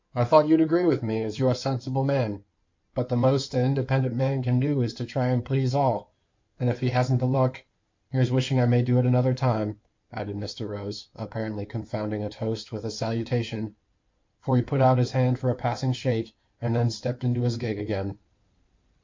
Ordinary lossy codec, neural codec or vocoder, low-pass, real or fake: MP3, 48 kbps; codec, 16 kHz in and 24 kHz out, 2.2 kbps, FireRedTTS-2 codec; 7.2 kHz; fake